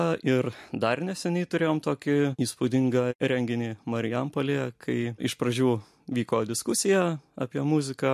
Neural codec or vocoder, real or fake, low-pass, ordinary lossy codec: none; real; 14.4 kHz; MP3, 64 kbps